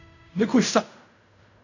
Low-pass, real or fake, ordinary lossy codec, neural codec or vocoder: 7.2 kHz; fake; none; codec, 16 kHz in and 24 kHz out, 0.4 kbps, LongCat-Audio-Codec, fine tuned four codebook decoder